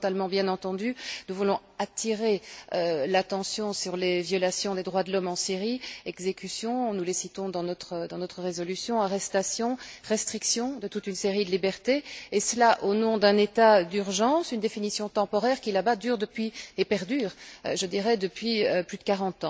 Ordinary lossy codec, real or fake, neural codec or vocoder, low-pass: none; real; none; none